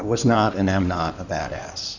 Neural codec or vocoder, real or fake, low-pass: codec, 16 kHz in and 24 kHz out, 2.2 kbps, FireRedTTS-2 codec; fake; 7.2 kHz